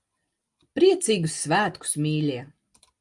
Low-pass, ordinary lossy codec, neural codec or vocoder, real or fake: 10.8 kHz; Opus, 32 kbps; none; real